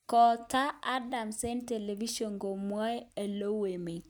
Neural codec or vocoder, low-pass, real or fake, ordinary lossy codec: none; none; real; none